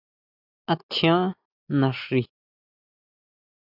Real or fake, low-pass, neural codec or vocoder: real; 5.4 kHz; none